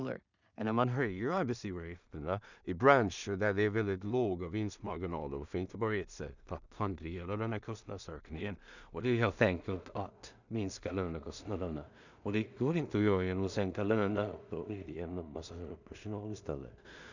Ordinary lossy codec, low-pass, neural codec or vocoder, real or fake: none; 7.2 kHz; codec, 16 kHz in and 24 kHz out, 0.4 kbps, LongCat-Audio-Codec, two codebook decoder; fake